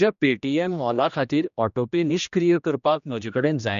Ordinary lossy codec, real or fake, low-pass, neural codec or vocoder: none; fake; 7.2 kHz; codec, 16 kHz, 1 kbps, X-Codec, HuBERT features, trained on general audio